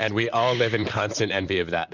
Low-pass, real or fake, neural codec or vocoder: 7.2 kHz; fake; vocoder, 44.1 kHz, 128 mel bands, Pupu-Vocoder